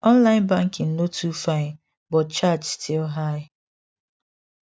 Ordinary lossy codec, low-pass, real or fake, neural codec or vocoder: none; none; real; none